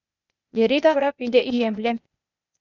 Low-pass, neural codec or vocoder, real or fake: 7.2 kHz; codec, 16 kHz, 0.8 kbps, ZipCodec; fake